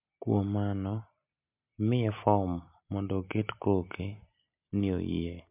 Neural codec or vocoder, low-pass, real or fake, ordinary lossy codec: none; 3.6 kHz; real; MP3, 32 kbps